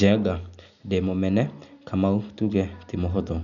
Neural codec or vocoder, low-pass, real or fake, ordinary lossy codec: none; 7.2 kHz; real; none